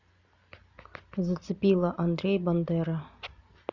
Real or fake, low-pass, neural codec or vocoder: real; 7.2 kHz; none